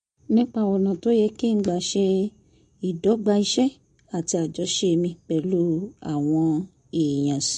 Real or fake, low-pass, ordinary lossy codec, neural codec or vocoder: real; 9.9 kHz; MP3, 48 kbps; none